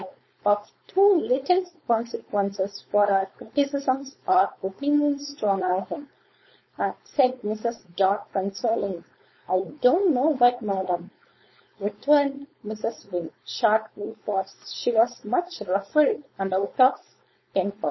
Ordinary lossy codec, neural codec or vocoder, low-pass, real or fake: MP3, 24 kbps; codec, 16 kHz, 4.8 kbps, FACodec; 7.2 kHz; fake